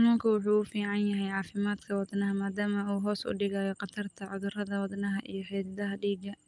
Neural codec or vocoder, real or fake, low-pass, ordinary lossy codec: none; real; 10.8 kHz; Opus, 32 kbps